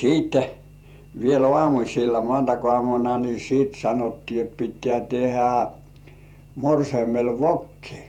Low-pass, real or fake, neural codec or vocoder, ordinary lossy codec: 19.8 kHz; fake; vocoder, 48 kHz, 128 mel bands, Vocos; none